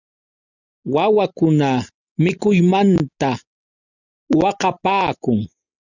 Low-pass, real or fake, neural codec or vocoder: 7.2 kHz; real; none